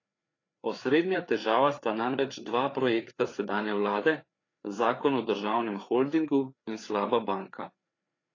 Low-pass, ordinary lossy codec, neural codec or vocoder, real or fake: 7.2 kHz; AAC, 32 kbps; codec, 16 kHz, 4 kbps, FreqCodec, larger model; fake